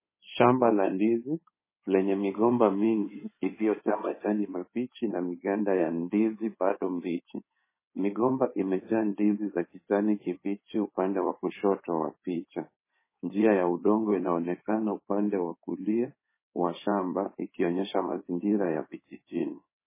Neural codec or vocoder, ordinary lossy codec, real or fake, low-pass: codec, 16 kHz in and 24 kHz out, 2.2 kbps, FireRedTTS-2 codec; MP3, 16 kbps; fake; 3.6 kHz